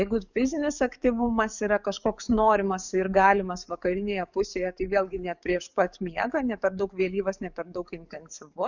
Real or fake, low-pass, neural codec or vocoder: fake; 7.2 kHz; codec, 44.1 kHz, 7.8 kbps, DAC